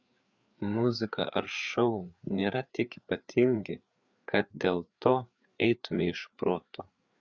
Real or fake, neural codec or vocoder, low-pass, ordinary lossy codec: fake; codec, 16 kHz, 4 kbps, FreqCodec, larger model; 7.2 kHz; Opus, 64 kbps